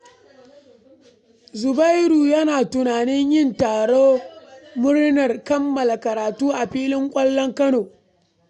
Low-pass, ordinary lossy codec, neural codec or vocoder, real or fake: 10.8 kHz; none; none; real